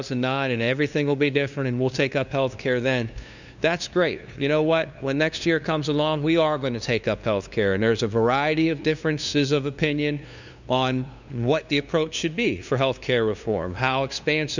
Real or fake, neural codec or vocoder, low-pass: fake; codec, 16 kHz, 2 kbps, FunCodec, trained on LibriTTS, 25 frames a second; 7.2 kHz